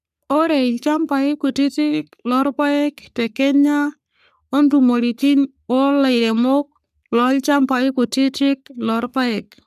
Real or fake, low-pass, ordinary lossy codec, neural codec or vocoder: fake; 14.4 kHz; none; codec, 44.1 kHz, 3.4 kbps, Pupu-Codec